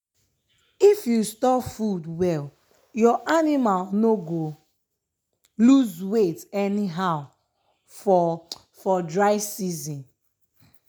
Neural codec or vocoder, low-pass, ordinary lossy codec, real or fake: none; none; none; real